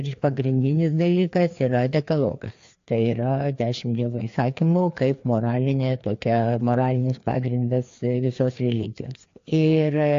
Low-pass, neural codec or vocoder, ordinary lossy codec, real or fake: 7.2 kHz; codec, 16 kHz, 2 kbps, FreqCodec, larger model; AAC, 48 kbps; fake